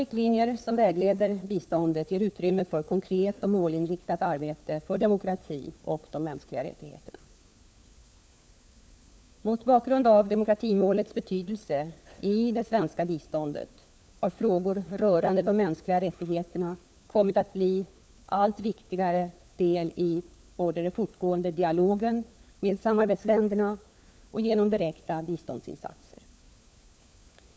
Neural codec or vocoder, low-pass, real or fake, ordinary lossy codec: codec, 16 kHz, 4 kbps, FunCodec, trained on LibriTTS, 50 frames a second; none; fake; none